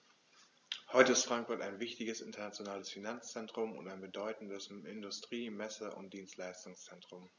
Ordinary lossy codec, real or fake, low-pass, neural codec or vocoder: none; real; 7.2 kHz; none